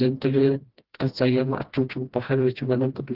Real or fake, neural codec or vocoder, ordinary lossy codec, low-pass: fake; codec, 16 kHz, 1 kbps, FreqCodec, smaller model; Opus, 16 kbps; 5.4 kHz